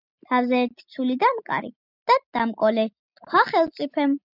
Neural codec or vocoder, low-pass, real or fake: none; 5.4 kHz; real